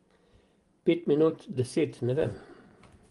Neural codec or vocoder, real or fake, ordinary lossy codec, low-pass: vocoder, 24 kHz, 100 mel bands, Vocos; fake; Opus, 24 kbps; 10.8 kHz